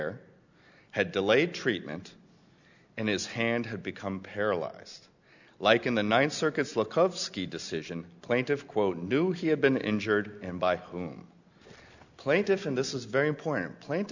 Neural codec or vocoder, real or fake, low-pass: none; real; 7.2 kHz